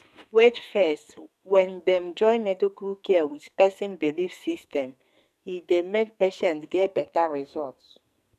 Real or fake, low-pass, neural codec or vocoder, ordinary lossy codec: fake; 14.4 kHz; codec, 44.1 kHz, 2.6 kbps, SNAC; none